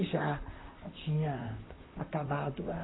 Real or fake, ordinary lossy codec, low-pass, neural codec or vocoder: fake; AAC, 16 kbps; 7.2 kHz; vocoder, 44.1 kHz, 128 mel bands, Pupu-Vocoder